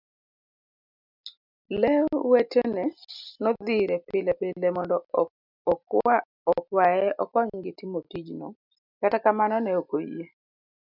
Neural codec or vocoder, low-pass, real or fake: none; 5.4 kHz; real